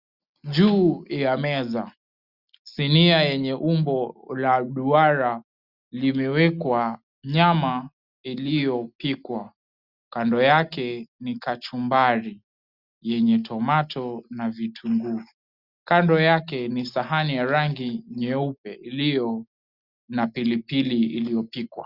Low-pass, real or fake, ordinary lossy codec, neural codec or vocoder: 5.4 kHz; real; Opus, 64 kbps; none